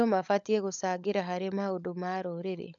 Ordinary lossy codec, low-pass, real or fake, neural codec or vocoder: none; 7.2 kHz; fake; codec, 16 kHz, 16 kbps, FunCodec, trained on LibriTTS, 50 frames a second